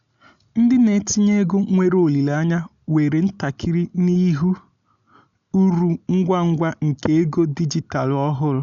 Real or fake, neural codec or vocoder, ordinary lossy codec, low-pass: real; none; none; 7.2 kHz